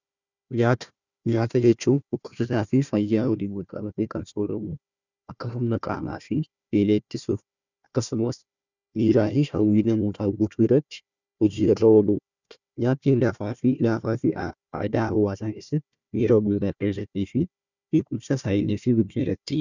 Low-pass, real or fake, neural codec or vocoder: 7.2 kHz; fake; codec, 16 kHz, 1 kbps, FunCodec, trained on Chinese and English, 50 frames a second